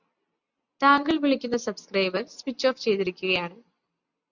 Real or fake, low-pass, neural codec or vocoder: real; 7.2 kHz; none